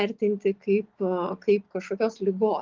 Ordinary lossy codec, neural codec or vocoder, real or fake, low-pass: Opus, 24 kbps; none; real; 7.2 kHz